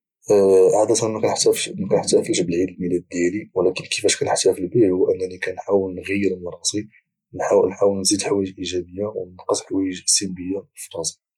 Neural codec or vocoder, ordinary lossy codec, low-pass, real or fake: none; none; 19.8 kHz; real